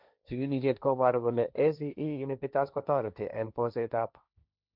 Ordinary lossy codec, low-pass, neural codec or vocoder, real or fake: none; 5.4 kHz; codec, 16 kHz, 1.1 kbps, Voila-Tokenizer; fake